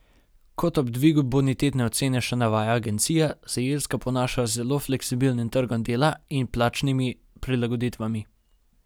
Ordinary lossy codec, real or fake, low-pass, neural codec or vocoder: none; real; none; none